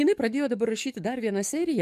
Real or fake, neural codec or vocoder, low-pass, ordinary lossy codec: fake; codec, 44.1 kHz, 7.8 kbps, DAC; 14.4 kHz; MP3, 96 kbps